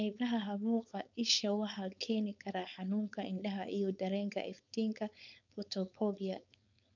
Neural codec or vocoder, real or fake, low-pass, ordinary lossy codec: codec, 16 kHz, 4.8 kbps, FACodec; fake; 7.2 kHz; none